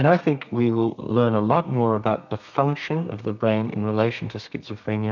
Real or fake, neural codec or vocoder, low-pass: fake; codec, 32 kHz, 1.9 kbps, SNAC; 7.2 kHz